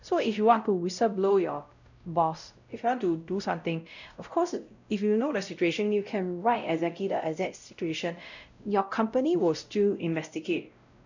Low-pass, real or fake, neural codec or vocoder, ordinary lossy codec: 7.2 kHz; fake; codec, 16 kHz, 0.5 kbps, X-Codec, WavLM features, trained on Multilingual LibriSpeech; none